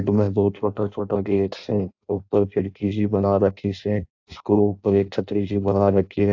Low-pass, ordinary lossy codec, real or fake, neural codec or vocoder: 7.2 kHz; AAC, 48 kbps; fake; codec, 16 kHz in and 24 kHz out, 0.6 kbps, FireRedTTS-2 codec